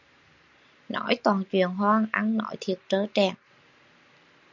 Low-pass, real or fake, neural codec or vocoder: 7.2 kHz; real; none